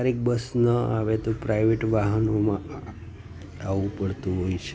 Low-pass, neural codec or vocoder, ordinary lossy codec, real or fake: none; none; none; real